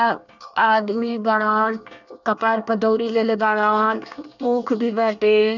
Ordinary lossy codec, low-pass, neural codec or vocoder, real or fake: none; 7.2 kHz; codec, 24 kHz, 1 kbps, SNAC; fake